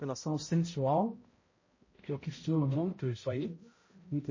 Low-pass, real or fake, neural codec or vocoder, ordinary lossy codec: 7.2 kHz; fake; codec, 16 kHz, 0.5 kbps, X-Codec, HuBERT features, trained on balanced general audio; MP3, 32 kbps